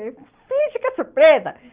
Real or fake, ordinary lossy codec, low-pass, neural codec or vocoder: real; Opus, 32 kbps; 3.6 kHz; none